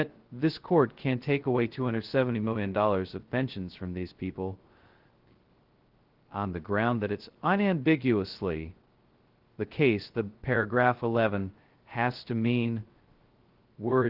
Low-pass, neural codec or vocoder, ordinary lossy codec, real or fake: 5.4 kHz; codec, 16 kHz, 0.2 kbps, FocalCodec; Opus, 16 kbps; fake